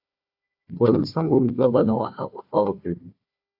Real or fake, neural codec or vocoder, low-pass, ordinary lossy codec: fake; codec, 16 kHz, 1 kbps, FunCodec, trained on Chinese and English, 50 frames a second; 5.4 kHz; AAC, 48 kbps